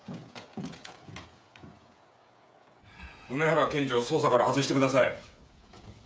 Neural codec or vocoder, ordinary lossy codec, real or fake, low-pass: codec, 16 kHz, 8 kbps, FreqCodec, smaller model; none; fake; none